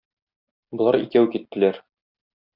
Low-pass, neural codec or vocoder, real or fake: 5.4 kHz; none; real